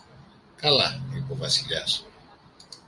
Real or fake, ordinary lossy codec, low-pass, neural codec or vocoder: real; Opus, 64 kbps; 10.8 kHz; none